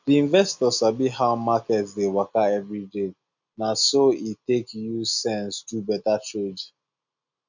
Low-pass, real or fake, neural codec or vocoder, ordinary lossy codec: 7.2 kHz; real; none; none